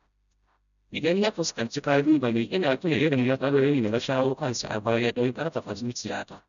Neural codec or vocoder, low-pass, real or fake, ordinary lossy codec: codec, 16 kHz, 0.5 kbps, FreqCodec, smaller model; 7.2 kHz; fake; none